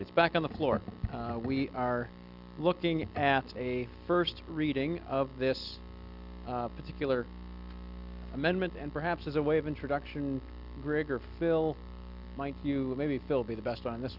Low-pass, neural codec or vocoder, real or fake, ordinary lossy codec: 5.4 kHz; none; real; Opus, 64 kbps